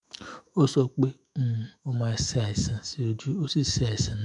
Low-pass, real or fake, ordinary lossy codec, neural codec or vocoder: 10.8 kHz; fake; MP3, 96 kbps; autoencoder, 48 kHz, 128 numbers a frame, DAC-VAE, trained on Japanese speech